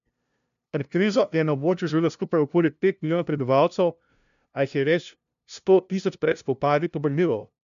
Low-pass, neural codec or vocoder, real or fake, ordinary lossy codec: 7.2 kHz; codec, 16 kHz, 0.5 kbps, FunCodec, trained on LibriTTS, 25 frames a second; fake; AAC, 96 kbps